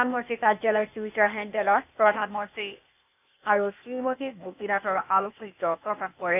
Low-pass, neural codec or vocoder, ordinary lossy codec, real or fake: 3.6 kHz; codec, 16 kHz, 0.8 kbps, ZipCodec; none; fake